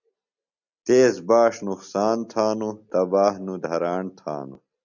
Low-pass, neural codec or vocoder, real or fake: 7.2 kHz; none; real